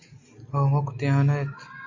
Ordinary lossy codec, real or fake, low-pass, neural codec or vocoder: AAC, 48 kbps; real; 7.2 kHz; none